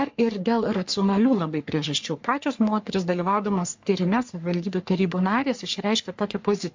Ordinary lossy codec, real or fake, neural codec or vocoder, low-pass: MP3, 48 kbps; fake; codec, 44.1 kHz, 3.4 kbps, Pupu-Codec; 7.2 kHz